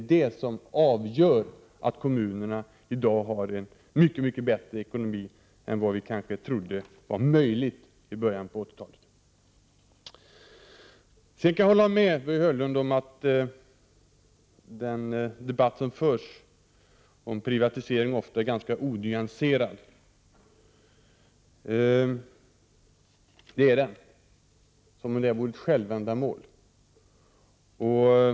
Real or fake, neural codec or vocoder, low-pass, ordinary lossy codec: real; none; none; none